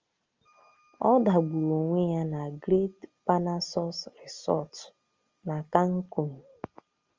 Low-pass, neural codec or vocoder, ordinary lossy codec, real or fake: 7.2 kHz; none; Opus, 32 kbps; real